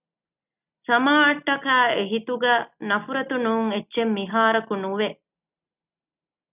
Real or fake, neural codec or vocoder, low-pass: real; none; 3.6 kHz